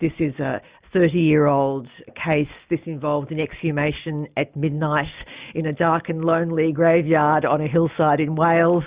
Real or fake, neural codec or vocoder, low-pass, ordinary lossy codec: real; none; 3.6 kHz; Opus, 64 kbps